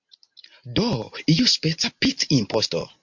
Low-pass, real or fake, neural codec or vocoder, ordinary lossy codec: 7.2 kHz; real; none; MP3, 48 kbps